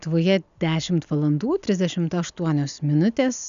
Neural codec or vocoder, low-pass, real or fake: none; 7.2 kHz; real